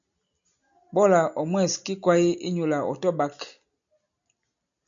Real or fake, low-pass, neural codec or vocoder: real; 7.2 kHz; none